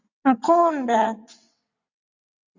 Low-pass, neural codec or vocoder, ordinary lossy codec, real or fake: 7.2 kHz; vocoder, 22.05 kHz, 80 mel bands, WaveNeXt; Opus, 64 kbps; fake